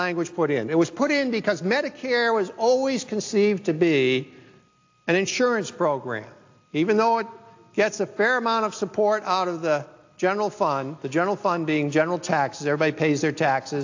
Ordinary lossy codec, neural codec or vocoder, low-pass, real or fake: AAC, 48 kbps; none; 7.2 kHz; real